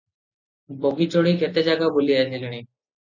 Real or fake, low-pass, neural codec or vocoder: real; 7.2 kHz; none